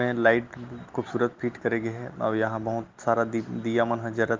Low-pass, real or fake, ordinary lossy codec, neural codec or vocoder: 7.2 kHz; real; Opus, 32 kbps; none